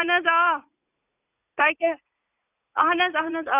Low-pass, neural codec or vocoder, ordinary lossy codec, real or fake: 3.6 kHz; vocoder, 44.1 kHz, 80 mel bands, Vocos; none; fake